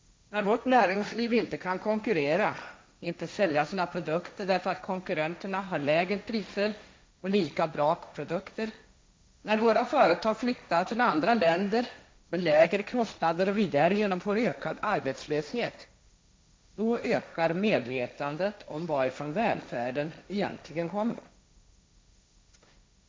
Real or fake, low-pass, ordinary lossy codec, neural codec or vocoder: fake; none; none; codec, 16 kHz, 1.1 kbps, Voila-Tokenizer